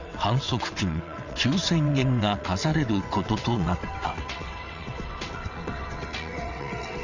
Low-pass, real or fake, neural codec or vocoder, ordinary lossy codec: 7.2 kHz; fake; vocoder, 22.05 kHz, 80 mel bands, WaveNeXt; none